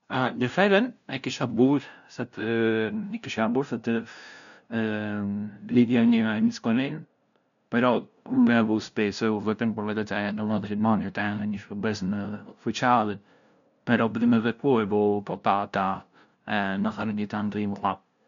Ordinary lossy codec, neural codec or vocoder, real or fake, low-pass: none; codec, 16 kHz, 0.5 kbps, FunCodec, trained on LibriTTS, 25 frames a second; fake; 7.2 kHz